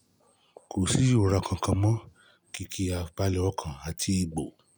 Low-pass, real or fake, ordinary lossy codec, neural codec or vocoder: none; real; none; none